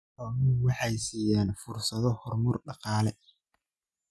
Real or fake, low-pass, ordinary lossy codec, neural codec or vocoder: real; none; none; none